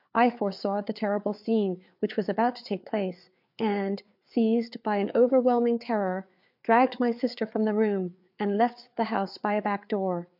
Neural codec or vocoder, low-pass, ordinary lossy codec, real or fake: codec, 16 kHz, 4 kbps, FreqCodec, larger model; 5.4 kHz; MP3, 48 kbps; fake